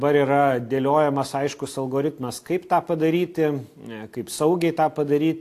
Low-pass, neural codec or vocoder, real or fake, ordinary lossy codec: 14.4 kHz; none; real; AAC, 64 kbps